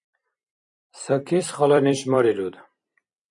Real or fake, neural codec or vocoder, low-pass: fake; vocoder, 44.1 kHz, 128 mel bands every 256 samples, BigVGAN v2; 10.8 kHz